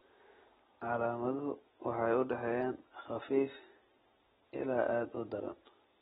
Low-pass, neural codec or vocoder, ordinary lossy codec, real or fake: 19.8 kHz; none; AAC, 16 kbps; real